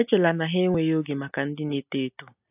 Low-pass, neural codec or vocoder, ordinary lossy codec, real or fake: 3.6 kHz; none; AAC, 24 kbps; real